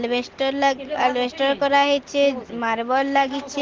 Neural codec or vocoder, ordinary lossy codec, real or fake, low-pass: none; Opus, 16 kbps; real; 7.2 kHz